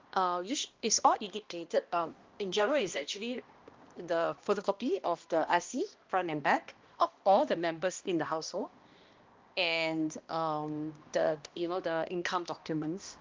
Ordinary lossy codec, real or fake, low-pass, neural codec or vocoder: Opus, 32 kbps; fake; 7.2 kHz; codec, 16 kHz, 1 kbps, X-Codec, HuBERT features, trained on balanced general audio